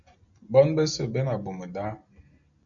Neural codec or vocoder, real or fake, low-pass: none; real; 7.2 kHz